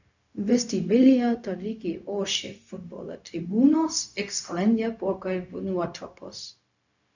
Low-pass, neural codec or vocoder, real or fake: 7.2 kHz; codec, 16 kHz, 0.4 kbps, LongCat-Audio-Codec; fake